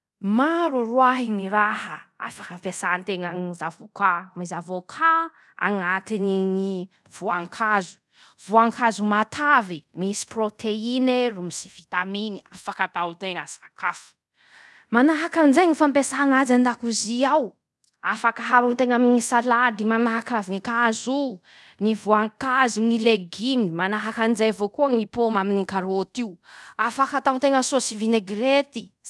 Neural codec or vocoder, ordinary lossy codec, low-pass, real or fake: codec, 24 kHz, 0.5 kbps, DualCodec; none; none; fake